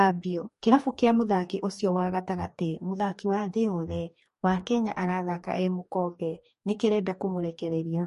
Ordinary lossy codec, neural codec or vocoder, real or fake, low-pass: MP3, 48 kbps; codec, 44.1 kHz, 2.6 kbps, DAC; fake; 14.4 kHz